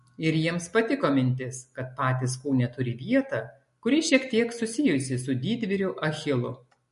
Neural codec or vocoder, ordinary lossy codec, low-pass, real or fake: none; MP3, 48 kbps; 14.4 kHz; real